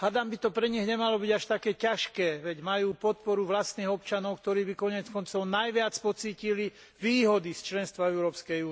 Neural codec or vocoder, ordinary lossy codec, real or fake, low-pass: none; none; real; none